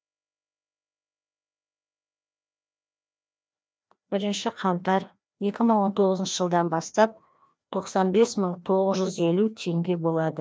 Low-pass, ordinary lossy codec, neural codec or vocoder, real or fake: none; none; codec, 16 kHz, 1 kbps, FreqCodec, larger model; fake